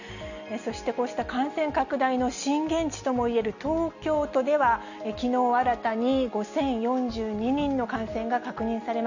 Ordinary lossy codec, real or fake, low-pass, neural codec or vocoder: none; real; 7.2 kHz; none